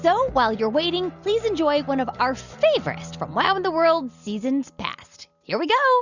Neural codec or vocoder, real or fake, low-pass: none; real; 7.2 kHz